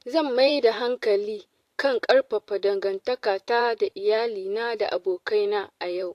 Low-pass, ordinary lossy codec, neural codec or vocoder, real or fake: 14.4 kHz; AAC, 64 kbps; vocoder, 44.1 kHz, 128 mel bands every 512 samples, BigVGAN v2; fake